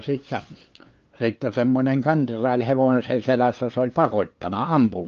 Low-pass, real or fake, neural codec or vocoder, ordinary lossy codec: 7.2 kHz; fake; codec, 16 kHz, 2 kbps, FunCodec, trained on LibriTTS, 25 frames a second; Opus, 24 kbps